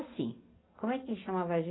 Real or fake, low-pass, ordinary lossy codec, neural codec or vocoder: real; 7.2 kHz; AAC, 16 kbps; none